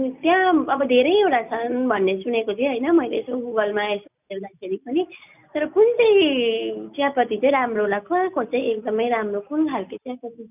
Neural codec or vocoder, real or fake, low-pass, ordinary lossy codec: none; real; 3.6 kHz; none